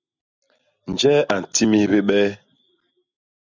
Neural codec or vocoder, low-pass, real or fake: none; 7.2 kHz; real